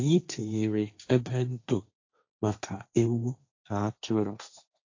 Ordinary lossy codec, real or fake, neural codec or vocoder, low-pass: AAC, 48 kbps; fake; codec, 16 kHz, 1.1 kbps, Voila-Tokenizer; 7.2 kHz